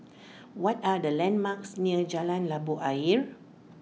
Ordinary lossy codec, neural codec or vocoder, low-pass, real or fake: none; none; none; real